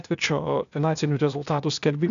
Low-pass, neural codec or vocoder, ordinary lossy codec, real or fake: 7.2 kHz; codec, 16 kHz, 0.8 kbps, ZipCodec; MP3, 96 kbps; fake